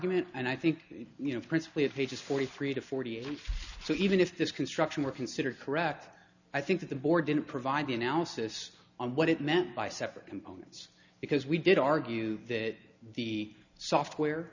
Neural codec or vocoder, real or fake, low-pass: none; real; 7.2 kHz